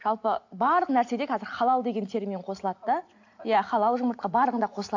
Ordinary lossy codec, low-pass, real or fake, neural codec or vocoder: AAC, 48 kbps; 7.2 kHz; real; none